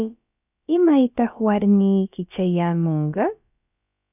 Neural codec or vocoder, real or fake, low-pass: codec, 16 kHz, about 1 kbps, DyCAST, with the encoder's durations; fake; 3.6 kHz